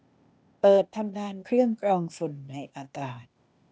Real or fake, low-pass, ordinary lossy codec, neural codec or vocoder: fake; none; none; codec, 16 kHz, 0.8 kbps, ZipCodec